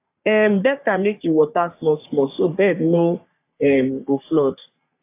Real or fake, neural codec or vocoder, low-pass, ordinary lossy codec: fake; codec, 44.1 kHz, 3.4 kbps, Pupu-Codec; 3.6 kHz; AAC, 24 kbps